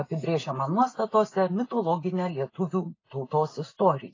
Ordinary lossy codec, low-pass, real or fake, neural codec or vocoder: AAC, 32 kbps; 7.2 kHz; real; none